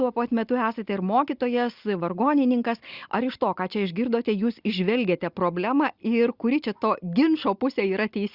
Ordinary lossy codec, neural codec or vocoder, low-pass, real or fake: Opus, 64 kbps; none; 5.4 kHz; real